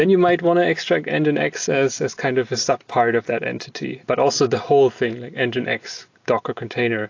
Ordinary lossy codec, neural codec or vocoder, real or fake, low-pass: AAC, 48 kbps; none; real; 7.2 kHz